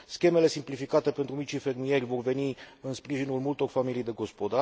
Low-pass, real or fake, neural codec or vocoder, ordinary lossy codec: none; real; none; none